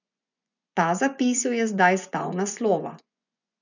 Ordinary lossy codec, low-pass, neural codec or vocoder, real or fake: none; 7.2 kHz; vocoder, 24 kHz, 100 mel bands, Vocos; fake